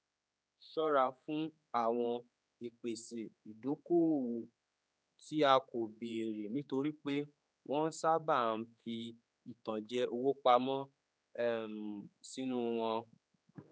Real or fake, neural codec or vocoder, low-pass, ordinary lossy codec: fake; codec, 16 kHz, 4 kbps, X-Codec, HuBERT features, trained on general audio; none; none